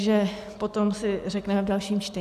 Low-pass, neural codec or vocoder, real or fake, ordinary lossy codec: 14.4 kHz; none; real; AAC, 96 kbps